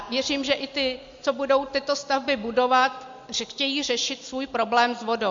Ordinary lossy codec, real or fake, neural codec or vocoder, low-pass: MP3, 48 kbps; real; none; 7.2 kHz